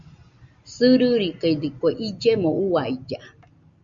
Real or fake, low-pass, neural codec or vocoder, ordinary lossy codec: real; 7.2 kHz; none; Opus, 64 kbps